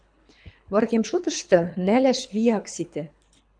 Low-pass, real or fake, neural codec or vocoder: 9.9 kHz; fake; codec, 24 kHz, 3 kbps, HILCodec